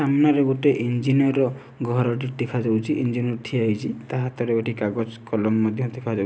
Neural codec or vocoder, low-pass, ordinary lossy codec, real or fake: none; none; none; real